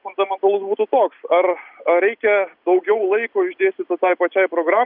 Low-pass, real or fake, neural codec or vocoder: 5.4 kHz; real; none